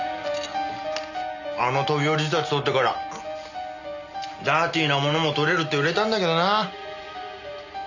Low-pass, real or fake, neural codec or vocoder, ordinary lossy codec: 7.2 kHz; real; none; none